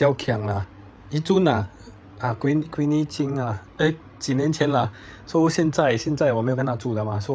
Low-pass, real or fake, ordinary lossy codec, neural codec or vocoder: none; fake; none; codec, 16 kHz, 8 kbps, FreqCodec, larger model